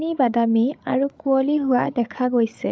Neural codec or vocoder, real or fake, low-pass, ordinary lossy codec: codec, 16 kHz, 8 kbps, FreqCodec, larger model; fake; none; none